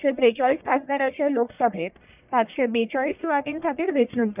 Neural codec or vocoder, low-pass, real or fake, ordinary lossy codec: codec, 44.1 kHz, 1.7 kbps, Pupu-Codec; 3.6 kHz; fake; none